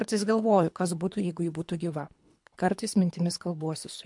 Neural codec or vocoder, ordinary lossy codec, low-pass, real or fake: codec, 24 kHz, 3 kbps, HILCodec; MP3, 64 kbps; 10.8 kHz; fake